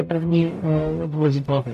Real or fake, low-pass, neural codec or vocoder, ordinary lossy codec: fake; 14.4 kHz; codec, 44.1 kHz, 0.9 kbps, DAC; AAC, 96 kbps